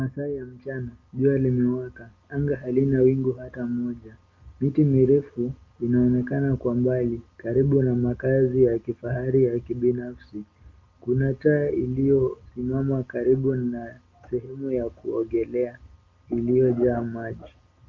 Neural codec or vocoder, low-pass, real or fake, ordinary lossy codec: none; 7.2 kHz; real; Opus, 32 kbps